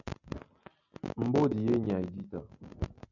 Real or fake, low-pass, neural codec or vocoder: real; 7.2 kHz; none